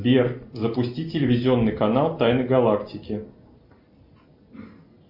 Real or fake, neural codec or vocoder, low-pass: real; none; 5.4 kHz